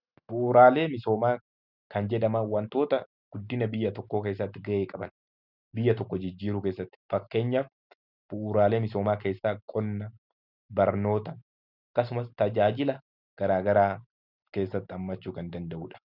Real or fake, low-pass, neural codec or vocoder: real; 5.4 kHz; none